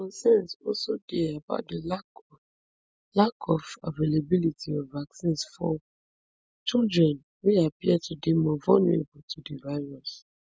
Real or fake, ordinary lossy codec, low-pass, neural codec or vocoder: real; none; none; none